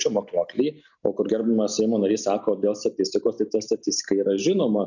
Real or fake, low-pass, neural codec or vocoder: real; 7.2 kHz; none